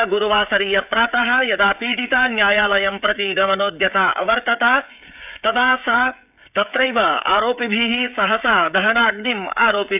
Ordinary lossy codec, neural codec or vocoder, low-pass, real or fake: none; codec, 16 kHz, 16 kbps, FreqCodec, smaller model; 3.6 kHz; fake